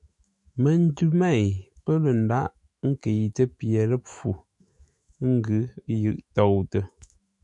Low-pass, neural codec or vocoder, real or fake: 10.8 kHz; autoencoder, 48 kHz, 128 numbers a frame, DAC-VAE, trained on Japanese speech; fake